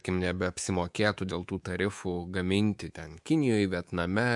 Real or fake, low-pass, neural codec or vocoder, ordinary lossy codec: fake; 10.8 kHz; codec, 24 kHz, 3.1 kbps, DualCodec; MP3, 64 kbps